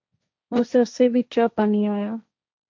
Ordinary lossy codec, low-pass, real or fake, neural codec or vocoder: MP3, 48 kbps; 7.2 kHz; fake; codec, 16 kHz, 1.1 kbps, Voila-Tokenizer